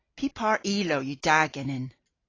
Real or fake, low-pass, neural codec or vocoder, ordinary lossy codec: real; 7.2 kHz; none; AAC, 32 kbps